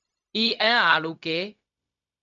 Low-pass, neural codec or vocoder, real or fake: 7.2 kHz; codec, 16 kHz, 0.4 kbps, LongCat-Audio-Codec; fake